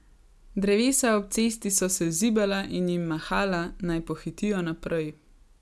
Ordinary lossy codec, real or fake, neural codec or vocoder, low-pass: none; real; none; none